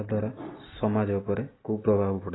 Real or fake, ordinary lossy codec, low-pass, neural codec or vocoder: real; AAC, 16 kbps; 7.2 kHz; none